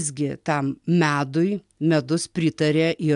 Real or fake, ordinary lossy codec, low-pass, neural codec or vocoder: real; AAC, 96 kbps; 10.8 kHz; none